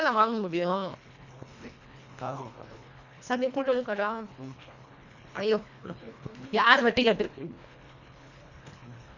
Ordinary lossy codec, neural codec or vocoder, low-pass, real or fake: none; codec, 24 kHz, 1.5 kbps, HILCodec; 7.2 kHz; fake